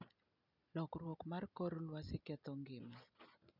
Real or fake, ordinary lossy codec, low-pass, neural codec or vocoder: real; none; 5.4 kHz; none